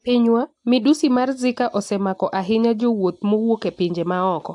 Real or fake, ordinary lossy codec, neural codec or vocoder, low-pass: real; AAC, 64 kbps; none; 10.8 kHz